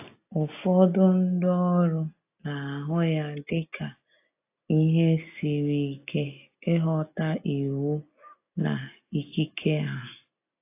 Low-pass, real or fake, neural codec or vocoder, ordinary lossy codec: 3.6 kHz; real; none; AAC, 24 kbps